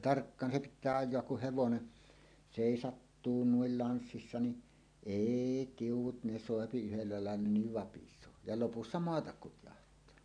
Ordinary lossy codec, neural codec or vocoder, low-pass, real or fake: none; none; 9.9 kHz; real